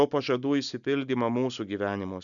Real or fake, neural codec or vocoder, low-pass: real; none; 7.2 kHz